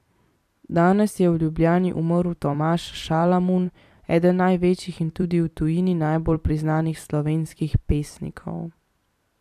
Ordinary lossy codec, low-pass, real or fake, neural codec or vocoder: AAC, 96 kbps; 14.4 kHz; fake; vocoder, 44.1 kHz, 128 mel bands every 256 samples, BigVGAN v2